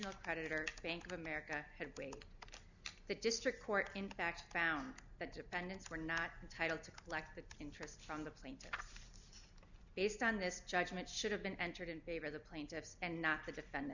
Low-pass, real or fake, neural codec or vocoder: 7.2 kHz; real; none